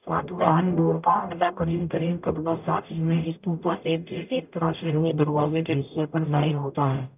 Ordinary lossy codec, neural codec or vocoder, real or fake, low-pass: none; codec, 44.1 kHz, 0.9 kbps, DAC; fake; 3.6 kHz